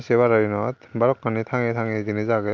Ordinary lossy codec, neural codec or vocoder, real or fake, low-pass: none; none; real; none